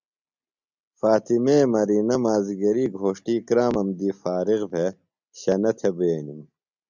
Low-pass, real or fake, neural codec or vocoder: 7.2 kHz; real; none